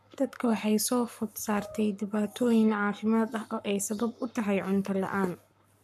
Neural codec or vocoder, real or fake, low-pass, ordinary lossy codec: codec, 44.1 kHz, 7.8 kbps, Pupu-Codec; fake; 14.4 kHz; none